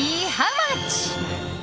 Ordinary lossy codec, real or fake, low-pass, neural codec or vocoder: none; real; none; none